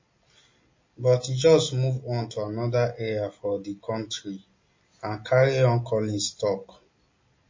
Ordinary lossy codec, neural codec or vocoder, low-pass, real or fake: MP3, 32 kbps; none; 7.2 kHz; real